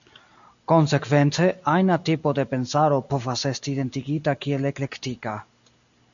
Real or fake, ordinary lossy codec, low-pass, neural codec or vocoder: real; MP3, 96 kbps; 7.2 kHz; none